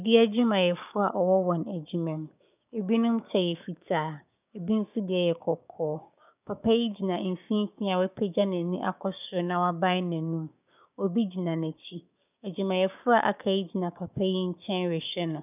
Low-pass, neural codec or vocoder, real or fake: 3.6 kHz; codec, 16 kHz, 6 kbps, DAC; fake